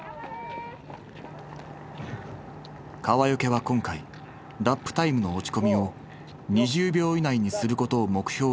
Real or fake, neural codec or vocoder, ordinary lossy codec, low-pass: real; none; none; none